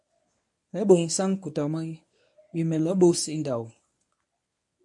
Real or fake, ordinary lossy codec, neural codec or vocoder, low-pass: fake; AAC, 64 kbps; codec, 24 kHz, 0.9 kbps, WavTokenizer, medium speech release version 1; 10.8 kHz